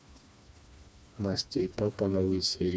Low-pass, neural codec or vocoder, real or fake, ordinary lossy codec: none; codec, 16 kHz, 2 kbps, FreqCodec, smaller model; fake; none